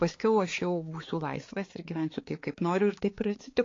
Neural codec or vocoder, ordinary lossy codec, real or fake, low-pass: codec, 16 kHz, 4 kbps, X-Codec, HuBERT features, trained on balanced general audio; AAC, 32 kbps; fake; 7.2 kHz